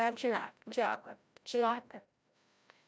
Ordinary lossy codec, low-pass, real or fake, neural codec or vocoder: none; none; fake; codec, 16 kHz, 0.5 kbps, FreqCodec, larger model